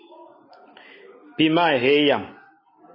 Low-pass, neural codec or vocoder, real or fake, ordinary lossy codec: 5.4 kHz; none; real; MP3, 24 kbps